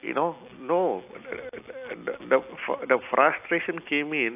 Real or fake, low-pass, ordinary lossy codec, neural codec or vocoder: real; 3.6 kHz; AAC, 32 kbps; none